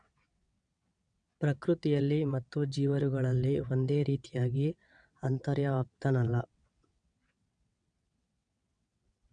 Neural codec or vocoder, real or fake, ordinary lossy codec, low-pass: vocoder, 22.05 kHz, 80 mel bands, WaveNeXt; fake; none; 9.9 kHz